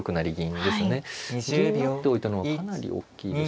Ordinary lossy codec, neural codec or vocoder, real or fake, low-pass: none; none; real; none